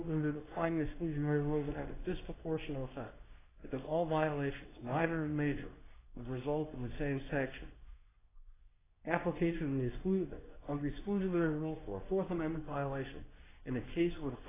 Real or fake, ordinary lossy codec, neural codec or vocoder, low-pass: fake; AAC, 16 kbps; codec, 24 kHz, 0.9 kbps, WavTokenizer, medium speech release version 2; 3.6 kHz